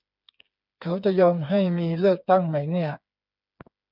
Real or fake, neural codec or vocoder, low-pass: fake; codec, 16 kHz, 4 kbps, FreqCodec, smaller model; 5.4 kHz